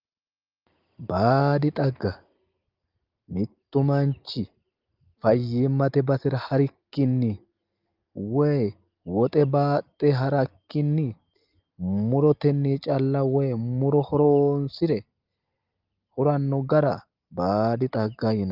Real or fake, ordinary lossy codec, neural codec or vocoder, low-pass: real; Opus, 32 kbps; none; 5.4 kHz